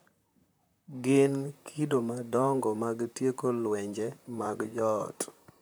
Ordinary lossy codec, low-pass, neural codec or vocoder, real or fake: none; none; vocoder, 44.1 kHz, 128 mel bands, Pupu-Vocoder; fake